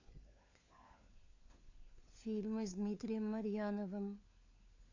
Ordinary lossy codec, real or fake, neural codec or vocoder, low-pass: AAC, 48 kbps; fake; codec, 16 kHz, 4 kbps, FunCodec, trained on LibriTTS, 50 frames a second; 7.2 kHz